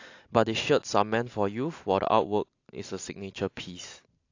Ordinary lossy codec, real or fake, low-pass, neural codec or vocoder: AAC, 48 kbps; real; 7.2 kHz; none